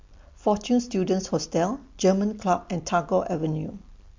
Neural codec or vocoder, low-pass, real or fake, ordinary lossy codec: none; 7.2 kHz; real; MP3, 48 kbps